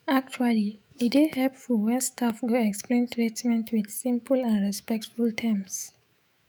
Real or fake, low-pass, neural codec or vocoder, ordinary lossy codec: fake; none; autoencoder, 48 kHz, 128 numbers a frame, DAC-VAE, trained on Japanese speech; none